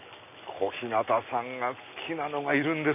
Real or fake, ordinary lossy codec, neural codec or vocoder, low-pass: real; none; none; 3.6 kHz